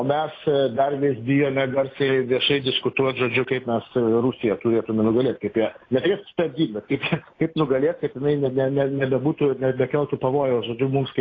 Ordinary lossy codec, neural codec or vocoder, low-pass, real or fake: AAC, 32 kbps; none; 7.2 kHz; real